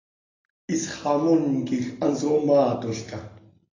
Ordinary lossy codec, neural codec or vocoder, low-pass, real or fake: AAC, 32 kbps; none; 7.2 kHz; real